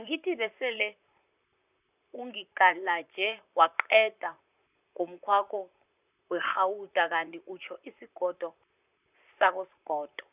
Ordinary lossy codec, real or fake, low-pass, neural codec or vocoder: none; fake; 3.6 kHz; vocoder, 44.1 kHz, 128 mel bands, Pupu-Vocoder